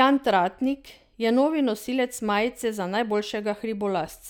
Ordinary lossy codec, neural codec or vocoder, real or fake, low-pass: none; none; real; 19.8 kHz